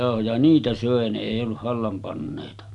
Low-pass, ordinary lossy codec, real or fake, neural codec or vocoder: 10.8 kHz; none; real; none